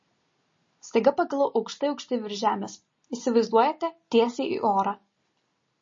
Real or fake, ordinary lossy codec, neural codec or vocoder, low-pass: real; MP3, 32 kbps; none; 7.2 kHz